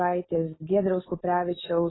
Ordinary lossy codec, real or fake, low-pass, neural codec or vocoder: AAC, 16 kbps; real; 7.2 kHz; none